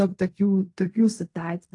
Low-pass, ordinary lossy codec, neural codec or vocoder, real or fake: 10.8 kHz; AAC, 48 kbps; codec, 16 kHz in and 24 kHz out, 0.4 kbps, LongCat-Audio-Codec, fine tuned four codebook decoder; fake